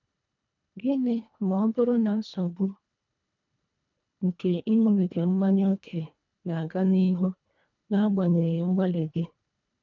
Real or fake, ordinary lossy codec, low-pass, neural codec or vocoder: fake; none; 7.2 kHz; codec, 24 kHz, 1.5 kbps, HILCodec